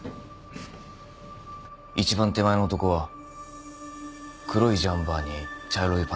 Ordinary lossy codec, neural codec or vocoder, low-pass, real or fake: none; none; none; real